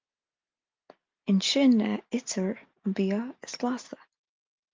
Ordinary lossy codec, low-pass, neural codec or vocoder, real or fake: Opus, 24 kbps; 7.2 kHz; none; real